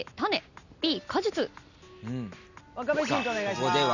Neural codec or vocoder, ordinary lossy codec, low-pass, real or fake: none; none; 7.2 kHz; real